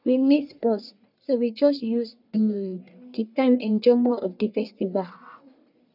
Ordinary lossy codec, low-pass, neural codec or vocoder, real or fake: none; 5.4 kHz; codec, 44.1 kHz, 1.7 kbps, Pupu-Codec; fake